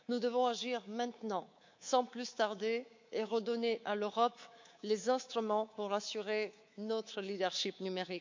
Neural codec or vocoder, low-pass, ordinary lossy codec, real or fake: codec, 24 kHz, 3.1 kbps, DualCodec; 7.2 kHz; MP3, 48 kbps; fake